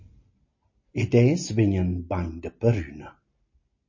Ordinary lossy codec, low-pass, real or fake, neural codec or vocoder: MP3, 32 kbps; 7.2 kHz; real; none